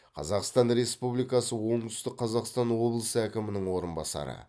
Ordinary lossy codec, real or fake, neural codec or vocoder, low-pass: none; real; none; none